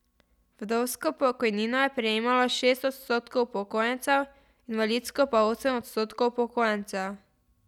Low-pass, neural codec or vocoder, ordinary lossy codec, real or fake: 19.8 kHz; none; none; real